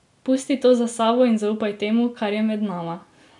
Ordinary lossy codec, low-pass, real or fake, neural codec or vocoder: none; 10.8 kHz; real; none